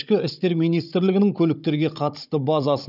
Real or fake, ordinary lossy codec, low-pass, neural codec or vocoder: fake; none; 5.4 kHz; codec, 16 kHz, 16 kbps, FunCodec, trained on Chinese and English, 50 frames a second